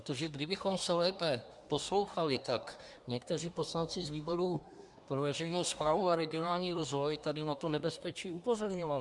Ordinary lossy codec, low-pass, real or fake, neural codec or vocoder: Opus, 64 kbps; 10.8 kHz; fake; codec, 24 kHz, 1 kbps, SNAC